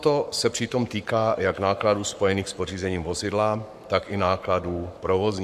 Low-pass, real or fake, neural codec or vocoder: 14.4 kHz; fake; codec, 44.1 kHz, 7.8 kbps, Pupu-Codec